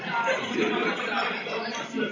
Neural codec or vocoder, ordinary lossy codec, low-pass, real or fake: none; AAC, 32 kbps; 7.2 kHz; real